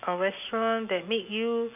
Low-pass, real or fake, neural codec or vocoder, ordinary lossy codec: 3.6 kHz; real; none; none